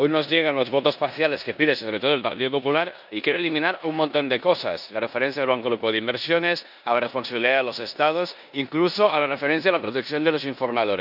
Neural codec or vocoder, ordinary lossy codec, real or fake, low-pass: codec, 16 kHz in and 24 kHz out, 0.9 kbps, LongCat-Audio-Codec, four codebook decoder; none; fake; 5.4 kHz